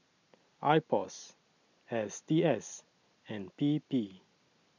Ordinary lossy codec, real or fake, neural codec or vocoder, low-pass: none; real; none; 7.2 kHz